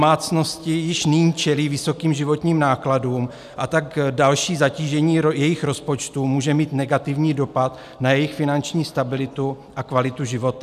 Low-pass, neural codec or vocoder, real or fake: 14.4 kHz; none; real